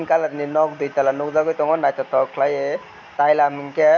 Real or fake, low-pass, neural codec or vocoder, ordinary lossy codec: real; 7.2 kHz; none; none